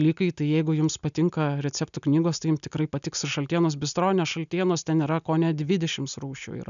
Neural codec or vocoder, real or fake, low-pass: none; real; 7.2 kHz